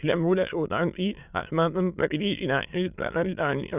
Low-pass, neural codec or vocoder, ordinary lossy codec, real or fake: 3.6 kHz; autoencoder, 22.05 kHz, a latent of 192 numbers a frame, VITS, trained on many speakers; none; fake